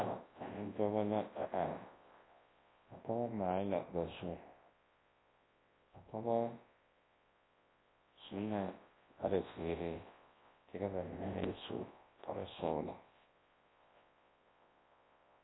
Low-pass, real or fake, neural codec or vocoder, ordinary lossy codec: 7.2 kHz; fake; codec, 24 kHz, 0.9 kbps, WavTokenizer, large speech release; AAC, 16 kbps